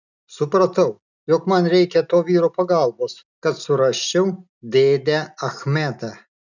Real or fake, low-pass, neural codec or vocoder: real; 7.2 kHz; none